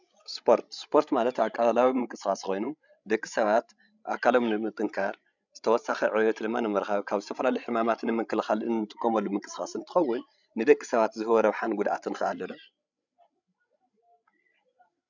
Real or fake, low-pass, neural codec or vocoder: fake; 7.2 kHz; codec, 16 kHz, 16 kbps, FreqCodec, larger model